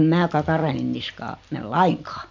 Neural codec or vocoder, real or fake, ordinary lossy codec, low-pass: none; real; MP3, 48 kbps; 7.2 kHz